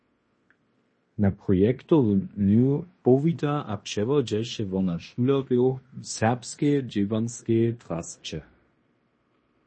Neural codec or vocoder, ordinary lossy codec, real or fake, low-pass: codec, 16 kHz in and 24 kHz out, 0.9 kbps, LongCat-Audio-Codec, fine tuned four codebook decoder; MP3, 32 kbps; fake; 9.9 kHz